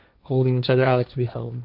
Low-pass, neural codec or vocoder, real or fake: 5.4 kHz; codec, 16 kHz, 1.1 kbps, Voila-Tokenizer; fake